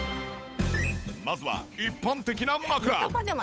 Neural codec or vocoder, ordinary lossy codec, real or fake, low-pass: codec, 16 kHz, 8 kbps, FunCodec, trained on Chinese and English, 25 frames a second; none; fake; none